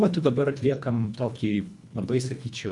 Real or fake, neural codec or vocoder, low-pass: fake; codec, 24 kHz, 1.5 kbps, HILCodec; 10.8 kHz